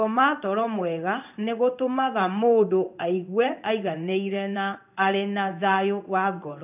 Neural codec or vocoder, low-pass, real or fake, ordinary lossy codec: codec, 16 kHz in and 24 kHz out, 1 kbps, XY-Tokenizer; 3.6 kHz; fake; none